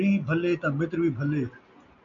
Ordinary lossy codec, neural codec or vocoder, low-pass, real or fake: MP3, 96 kbps; none; 7.2 kHz; real